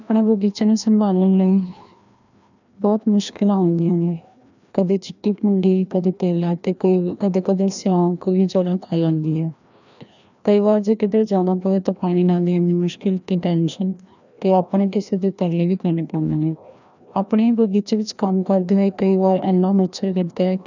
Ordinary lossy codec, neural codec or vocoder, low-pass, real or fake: none; codec, 16 kHz, 1 kbps, FreqCodec, larger model; 7.2 kHz; fake